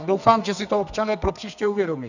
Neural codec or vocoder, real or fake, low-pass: codec, 32 kHz, 1.9 kbps, SNAC; fake; 7.2 kHz